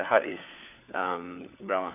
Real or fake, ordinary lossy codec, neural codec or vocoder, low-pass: fake; none; codec, 16 kHz, 4 kbps, FunCodec, trained on LibriTTS, 50 frames a second; 3.6 kHz